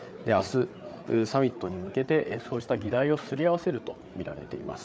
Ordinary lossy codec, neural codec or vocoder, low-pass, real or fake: none; codec, 16 kHz, 8 kbps, FreqCodec, larger model; none; fake